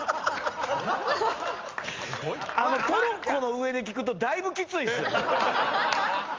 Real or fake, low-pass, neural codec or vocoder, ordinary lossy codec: real; 7.2 kHz; none; Opus, 32 kbps